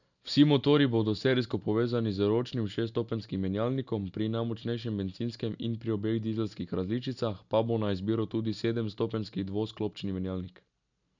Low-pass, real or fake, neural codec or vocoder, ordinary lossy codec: 7.2 kHz; real; none; none